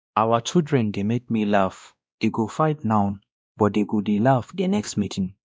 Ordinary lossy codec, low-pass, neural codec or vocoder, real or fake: none; none; codec, 16 kHz, 1 kbps, X-Codec, WavLM features, trained on Multilingual LibriSpeech; fake